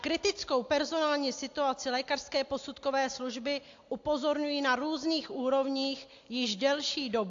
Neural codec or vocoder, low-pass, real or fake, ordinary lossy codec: none; 7.2 kHz; real; AAC, 48 kbps